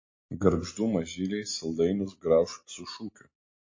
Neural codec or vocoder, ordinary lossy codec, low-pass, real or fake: none; MP3, 32 kbps; 7.2 kHz; real